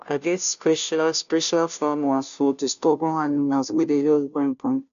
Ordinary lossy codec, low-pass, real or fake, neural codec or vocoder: none; 7.2 kHz; fake; codec, 16 kHz, 0.5 kbps, FunCodec, trained on Chinese and English, 25 frames a second